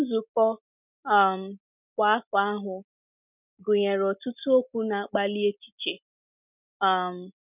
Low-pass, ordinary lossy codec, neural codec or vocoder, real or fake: 3.6 kHz; none; none; real